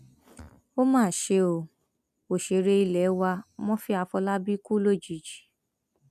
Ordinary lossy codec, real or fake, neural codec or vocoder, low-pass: none; real; none; 14.4 kHz